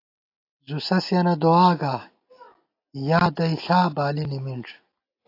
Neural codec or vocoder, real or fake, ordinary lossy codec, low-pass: none; real; AAC, 32 kbps; 5.4 kHz